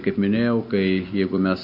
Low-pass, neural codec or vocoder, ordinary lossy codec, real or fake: 5.4 kHz; none; MP3, 48 kbps; real